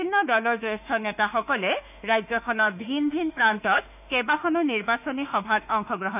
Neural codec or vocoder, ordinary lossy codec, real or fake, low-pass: autoencoder, 48 kHz, 32 numbers a frame, DAC-VAE, trained on Japanese speech; none; fake; 3.6 kHz